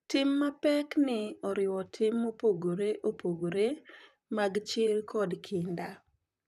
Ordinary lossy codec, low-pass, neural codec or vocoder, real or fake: none; 14.4 kHz; vocoder, 44.1 kHz, 128 mel bands, Pupu-Vocoder; fake